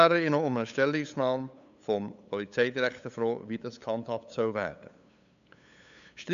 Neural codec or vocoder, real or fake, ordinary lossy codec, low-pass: codec, 16 kHz, 8 kbps, FunCodec, trained on LibriTTS, 25 frames a second; fake; AAC, 96 kbps; 7.2 kHz